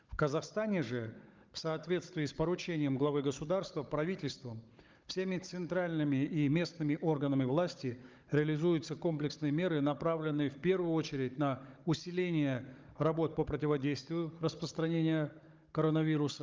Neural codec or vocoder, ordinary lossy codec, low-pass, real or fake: codec, 16 kHz, 16 kbps, FunCodec, trained on Chinese and English, 50 frames a second; Opus, 24 kbps; 7.2 kHz; fake